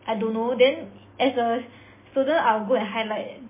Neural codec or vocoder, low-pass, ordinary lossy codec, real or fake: none; 3.6 kHz; MP3, 16 kbps; real